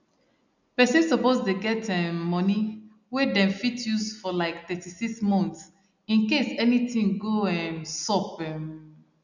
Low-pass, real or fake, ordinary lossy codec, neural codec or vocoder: 7.2 kHz; real; none; none